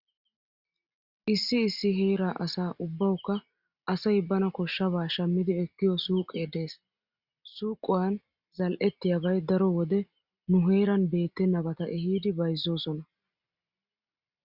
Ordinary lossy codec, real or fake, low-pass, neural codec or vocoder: Opus, 64 kbps; real; 5.4 kHz; none